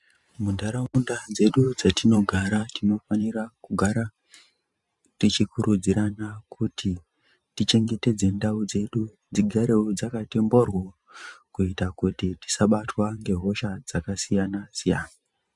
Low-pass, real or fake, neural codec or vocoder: 10.8 kHz; fake; vocoder, 44.1 kHz, 128 mel bands every 512 samples, BigVGAN v2